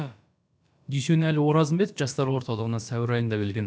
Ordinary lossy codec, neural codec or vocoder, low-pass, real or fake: none; codec, 16 kHz, about 1 kbps, DyCAST, with the encoder's durations; none; fake